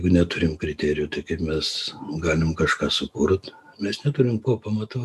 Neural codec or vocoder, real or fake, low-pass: none; real; 14.4 kHz